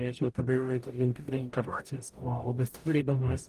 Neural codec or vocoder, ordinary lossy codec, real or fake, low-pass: codec, 44.1 kHz, 0.9 kbps, DAC; Opus, 24 kbps; fake; 14.4 kHz